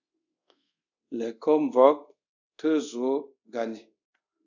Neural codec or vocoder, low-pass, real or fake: codec, 24 kHz, 0.5 kbps, DualCodec; 7.2 kHz; fake